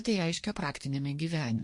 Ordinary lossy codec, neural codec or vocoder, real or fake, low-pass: MP3, 48 kbps; codec, 24 kHz, 1 kbps, SNAC; fake; 10.8 kHz